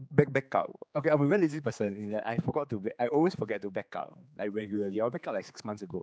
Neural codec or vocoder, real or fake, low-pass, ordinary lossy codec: codec, 16 kHz, 4 kbps, X-Codec, HuBERT features, trained on general audio; fake; none; none